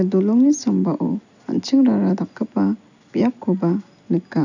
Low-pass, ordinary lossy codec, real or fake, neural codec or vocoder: 7.2 kHz; none; real; none